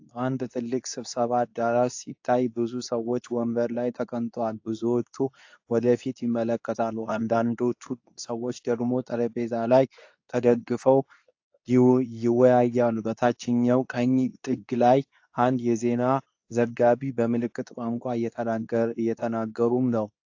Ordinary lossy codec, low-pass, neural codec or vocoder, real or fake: AAC, 48 kbps; 7.2 kHz; codec, 24 kHz, 0.9 kbps, WavTokenizer, medium speech release version 2; fake